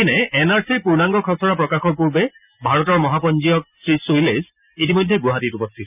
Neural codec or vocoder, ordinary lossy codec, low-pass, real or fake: none; none; 3.6 kHz; real